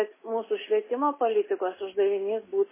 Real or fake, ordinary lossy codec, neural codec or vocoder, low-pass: real; MP3, 16 kbps; none; 3.6 kHz